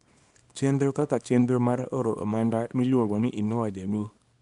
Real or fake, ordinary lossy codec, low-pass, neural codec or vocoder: fake; none; 10.8 kHz; codec, 24 kHz, 0.9 kbps, WavTokenizer, small release